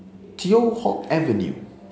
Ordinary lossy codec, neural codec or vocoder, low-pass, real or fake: none; none; none; real